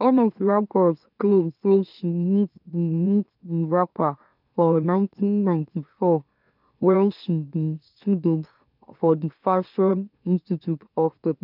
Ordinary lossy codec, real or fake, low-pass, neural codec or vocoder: none; fake; 5.4 kHz; autoencoder, 44.1 kHz, a latent of 192 numbers a frame, MeloTTS